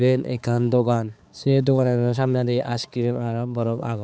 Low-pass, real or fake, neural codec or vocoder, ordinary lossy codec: none; fake; codec, 16 kHz, 4 kbps, X-Codec, HuBERT features, trained on balanced general audio; none